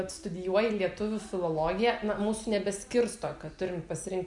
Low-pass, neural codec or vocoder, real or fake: 10.8 kHz; none; real